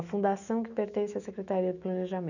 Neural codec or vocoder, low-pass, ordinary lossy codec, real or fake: autoencoder, 48 kHz, 128 numbers a frame, DAC-VAE, trained on Japanese speech; 7.2 kHz; none; fake